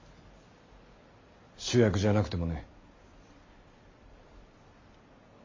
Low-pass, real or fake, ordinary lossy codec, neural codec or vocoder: 7.2 kHz; real; MP3, 32 kbps; none